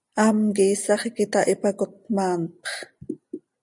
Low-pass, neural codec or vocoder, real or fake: 10.8 kHz; none; real